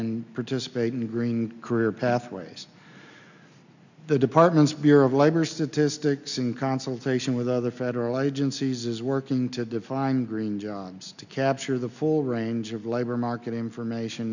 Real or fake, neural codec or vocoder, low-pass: real; none; 7.2 kHz